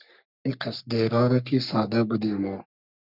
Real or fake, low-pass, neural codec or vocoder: fake; 5.4 kHz; codec, 44.1 kHz, 3.4 kbps, Pupu-Codec